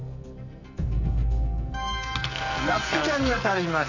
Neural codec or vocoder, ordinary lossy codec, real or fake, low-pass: codec, 44.1 kHz, 2.6 kbps, SNAC; none; fake; 7.2 kHz